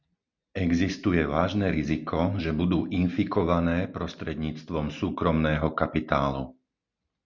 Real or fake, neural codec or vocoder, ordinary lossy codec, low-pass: real; none; Opus, 64 kbps; 7.2 kHz